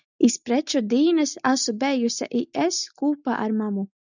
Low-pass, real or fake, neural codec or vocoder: 7.2 kHz; real; none